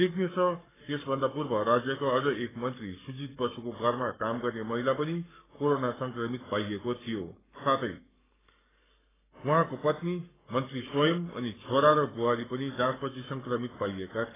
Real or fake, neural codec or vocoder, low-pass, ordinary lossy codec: fake; codec, 44.1 kHz, 7.8 kbps, Pupu-Codec; 3.6 kHz; AAC, 16 kbps